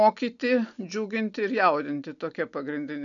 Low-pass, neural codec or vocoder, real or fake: 7.2 kHz; none; real